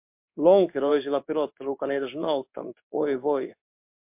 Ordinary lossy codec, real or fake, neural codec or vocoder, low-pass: MP3, 32 kbps; fake; codec, 16 kHz in and 24 kHz out, 1 kbps, XY-Tokenizer; 3.6 kHz